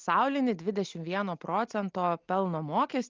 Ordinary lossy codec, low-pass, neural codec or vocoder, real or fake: Opus, 32 kbps; 7.2 kHz; none; real